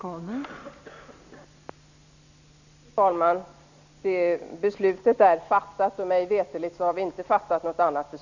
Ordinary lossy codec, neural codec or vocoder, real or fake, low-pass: none; none; real; 7.2 kHz